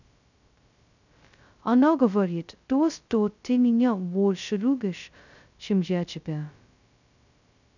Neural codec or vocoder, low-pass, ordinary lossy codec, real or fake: codec, 16 kHz, 0.2 kbps, FocalCodec; 7.2 kHz; none; fake